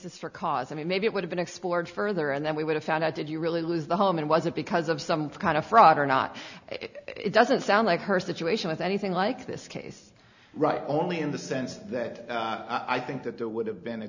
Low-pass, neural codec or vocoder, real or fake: 7.2 kHz; none; real